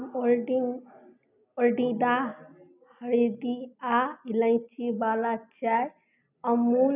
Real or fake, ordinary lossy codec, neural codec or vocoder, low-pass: real; none; none; 3.6 kHz